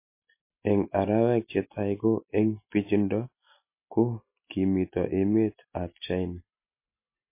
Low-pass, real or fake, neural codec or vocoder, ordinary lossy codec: 3.6 kHz; real; none; MP3, 24 kbps